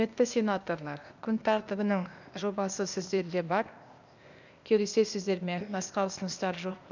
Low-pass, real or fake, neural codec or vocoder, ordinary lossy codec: 7.2 kHz; fake; codec, 16 kHz, 0.8 kbps, ZipCodec; none